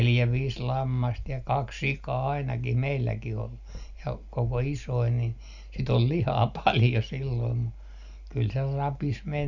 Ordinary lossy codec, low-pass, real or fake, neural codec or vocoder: none; 7.2 kHz; real; none